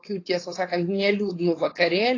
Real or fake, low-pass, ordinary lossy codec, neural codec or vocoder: fake; 7.2 kHz; AAC, 32 kbps; codec, 16 kHz, 4.8 kbps, FACodec